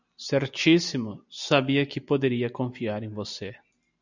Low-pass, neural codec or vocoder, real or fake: 7.2 kHz; none; real